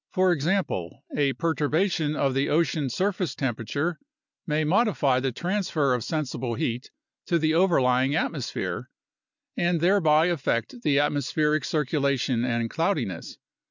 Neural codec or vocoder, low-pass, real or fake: none; 7.2 kHz; real